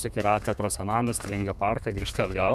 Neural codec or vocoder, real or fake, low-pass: codec, 32 kHz, 1.9 kbps, SNAC; fake; 14.4 kHz